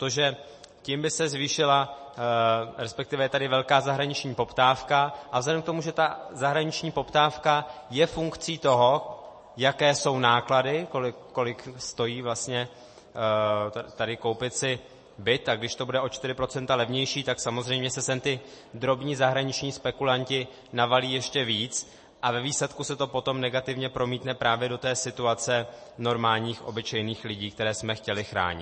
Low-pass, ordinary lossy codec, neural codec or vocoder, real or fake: 10.8 kHz; MP3, 32 kbps; none; real